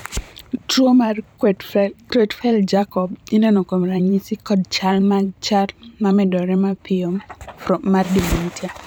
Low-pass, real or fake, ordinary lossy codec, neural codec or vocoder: none; fake; none; vocoder, 44.1 kHz, 128 mel bands every 512 samples, BigVGAN v2